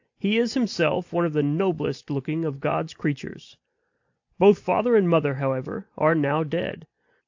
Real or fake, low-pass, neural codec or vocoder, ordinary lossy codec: real; 7.2 kHz; none; AAC, 48 kbps